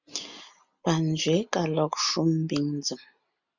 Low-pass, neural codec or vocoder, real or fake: 7.2 kHz; none; real